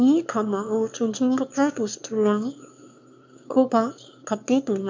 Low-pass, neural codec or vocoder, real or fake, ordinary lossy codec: 7.2 kHz; autoencoder, 22.05 kHz, a latent of 192 numbers a frame, VITS, trained on one speaker; fake; MP3, 64 kbps